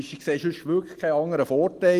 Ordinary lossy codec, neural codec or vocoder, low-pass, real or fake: Opus, 24 kbps; none; 14.4 kHz; real